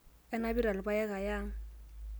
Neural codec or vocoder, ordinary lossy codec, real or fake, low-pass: none; none; real; none